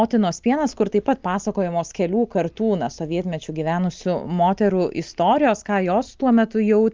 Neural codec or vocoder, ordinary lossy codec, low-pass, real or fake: none; Opus, 32 kbps; 7.2 kHz; real